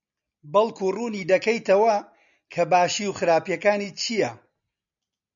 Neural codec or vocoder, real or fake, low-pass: none; real; 7.2 kHz